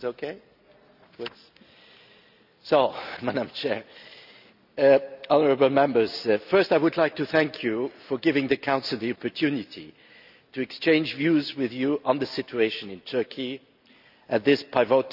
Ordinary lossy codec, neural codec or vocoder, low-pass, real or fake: none; none; 5.4 kHz; real